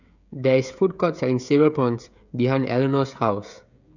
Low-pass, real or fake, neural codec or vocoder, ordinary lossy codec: 7.2 kHz; fake; codec, 16 kHz, 16 kbps, FreqCodec, smaller model; none